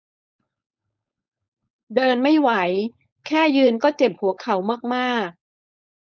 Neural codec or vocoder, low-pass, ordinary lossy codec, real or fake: codec, 16 kHz, 4.8 kbps, FACodec; none; none; fake